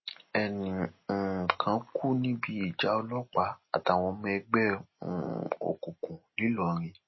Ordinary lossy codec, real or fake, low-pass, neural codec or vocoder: MP3, 24 kbps; real; 7.2 kHz; none